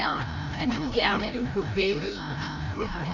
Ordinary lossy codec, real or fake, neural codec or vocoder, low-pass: none; fake; codec, 16 kHz, 0.5 kbps, FreqCodec, larger model; 7.2 kHz